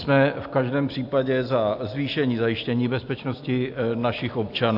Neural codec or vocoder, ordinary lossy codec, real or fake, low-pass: none; AAC, 48 kbps; real; 5.4 kHz